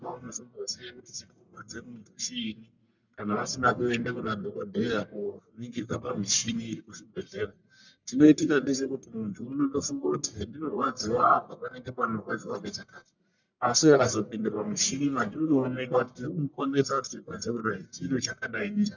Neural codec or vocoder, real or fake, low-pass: codec, 44.1 kHz, 1.7 kbps, Pupu-Codec; fake; 7.2 kHz